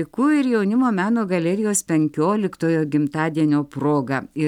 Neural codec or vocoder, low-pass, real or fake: none; 19.8 kHz; real